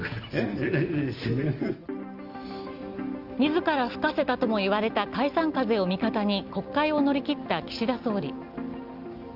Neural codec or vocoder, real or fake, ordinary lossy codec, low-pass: none; real; Opus, 24 kbps; 5.4 kHz